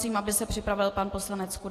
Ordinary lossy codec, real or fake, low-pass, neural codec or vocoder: AAC, 48 kbps; real; 14.4 kHz; none